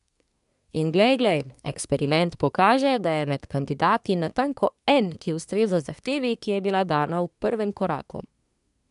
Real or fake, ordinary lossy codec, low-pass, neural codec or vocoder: fake; none; 10.8 kHz; codec, 24 kHz, 1 kbps, SNAC